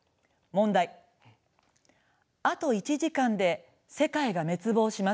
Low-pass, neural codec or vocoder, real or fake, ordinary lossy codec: none; none; real; none